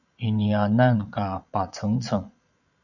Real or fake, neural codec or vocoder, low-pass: fake; vocoder, 22.05 kHz, 80 mel bands, Vocos; 7.2 kHz